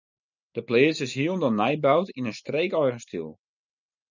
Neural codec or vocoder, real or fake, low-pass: none; real; 7.2 kHz